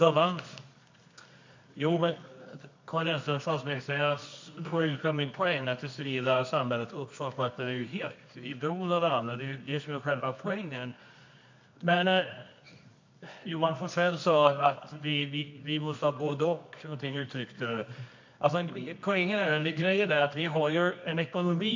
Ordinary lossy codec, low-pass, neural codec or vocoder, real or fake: MP3, 48 kbps; 7.2 kHz; codec, 24 kHz, 0.9 kbps, WavTokenizer, medium music audio release; fake